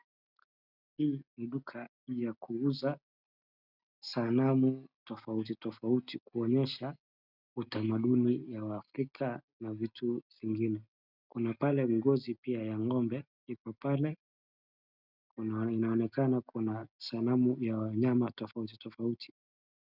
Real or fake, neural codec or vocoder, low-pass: real; none; 5.4 kHz